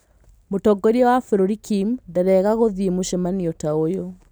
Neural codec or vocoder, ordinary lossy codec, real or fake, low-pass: none; none; real; none